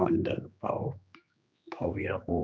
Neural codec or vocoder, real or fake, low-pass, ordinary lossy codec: codec, 16 kHz, 2 kbps, X-Codec, HuBERT features, trained on general audio; fake; none; none